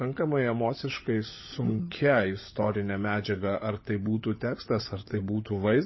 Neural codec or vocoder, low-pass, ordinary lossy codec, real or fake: codec, 16 kHz, 16 kbps, FunCodec, trained on LibriTTS, 50 frames a second; 7.2 kHz; MP3, 24 kbps; fake